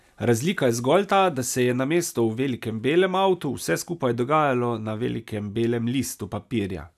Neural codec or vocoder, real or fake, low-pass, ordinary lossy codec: none; real; 14.4 kHz; none